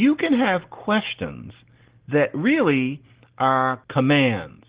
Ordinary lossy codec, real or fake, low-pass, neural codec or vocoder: Opus, 16 kbps; real; 3.6 kHz; none